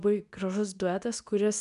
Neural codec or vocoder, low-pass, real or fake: codec, 24 kHz, 0.9 kbps, WavTokenizer, medium speech release version 2; 10.8 kHz; fake